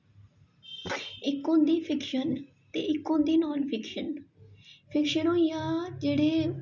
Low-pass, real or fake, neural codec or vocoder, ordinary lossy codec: 7.2 kHz; real; none; none